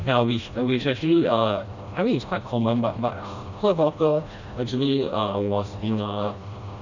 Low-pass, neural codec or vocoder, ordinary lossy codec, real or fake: 7.2 kHz; codec, 16 kHz, 1 kbps, FreqCodec, smaller model; none; fake